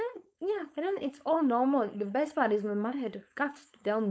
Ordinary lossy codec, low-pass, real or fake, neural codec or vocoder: none; none; fake; codec, 16 kHz, 4.8 kbps, FACodec